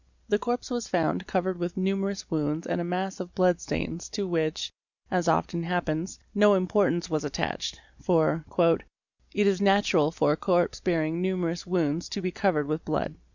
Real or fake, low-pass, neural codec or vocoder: real; 7.2 kHz; none